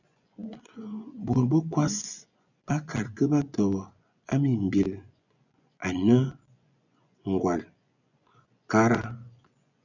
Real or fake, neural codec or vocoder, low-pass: fake; vocoder, 24 kHz, 100 mel bands, Vocos; 7.2 kHz